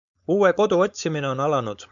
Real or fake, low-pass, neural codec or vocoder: fake; 7.2 kHz; codec, 16 kHz, 4.8 kbps, FACodec